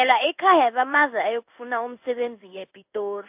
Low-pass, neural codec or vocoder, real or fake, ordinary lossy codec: 3.6 kHz; codec, 16 kHz in and 24 kHz out, 1 kbps, XY-Tokenizer; fake; AAC, 32 kbps